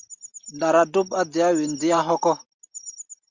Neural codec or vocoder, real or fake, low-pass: none; real; 7.2 kHz